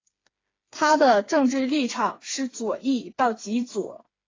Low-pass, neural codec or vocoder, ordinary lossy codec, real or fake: 7.2 kHz; codec, 16 kHz, 4 kbps, FreqCodec, smaller model; AAC, 32 kbps; fake